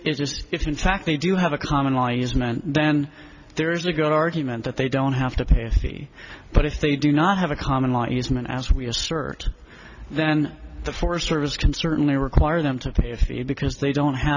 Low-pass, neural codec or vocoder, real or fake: 7.2 kHz; none; real